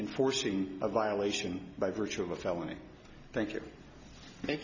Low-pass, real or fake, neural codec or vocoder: 7.2 kHz; real; none